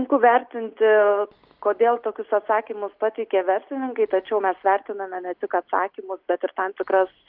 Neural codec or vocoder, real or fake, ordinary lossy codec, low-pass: none; real; Opus, 24 kbps; 5.4 kHz